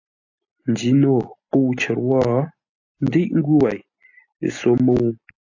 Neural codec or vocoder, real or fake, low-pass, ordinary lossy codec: none; real; 7.2 kHz; AAC, 48 kbps